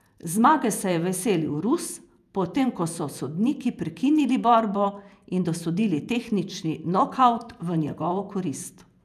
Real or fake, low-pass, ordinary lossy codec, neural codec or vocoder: fake; 14.4 kHz; none; vocoder, 48 kHz, 128 mel bands, Vocos